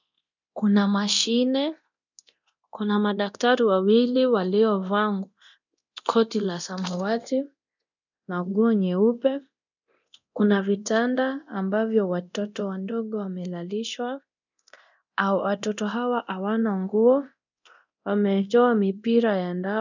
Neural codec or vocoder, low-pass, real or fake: codec, 24 kHz, 0.9 kbps, DualCodec; 7.2 kHz; fake